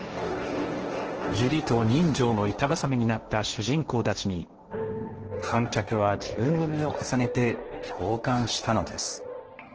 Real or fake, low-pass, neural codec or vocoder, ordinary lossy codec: fake; 7.2 kHz; codec, 16 kHz, 1.1 kbps, Voila-Tokenizer; Opus, 16 kbps